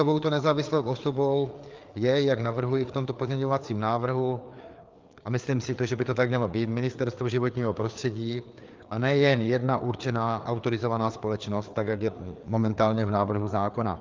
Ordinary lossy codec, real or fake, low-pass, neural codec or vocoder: Opus, 24 kbps; fake; 7.2 kHz; codec, 16 kHz, 4 kbps, FreqCodec, larger model